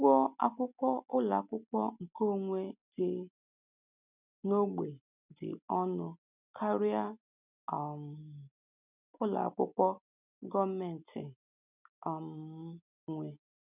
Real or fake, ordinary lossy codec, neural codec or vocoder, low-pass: real; none; none; 3.6 kHz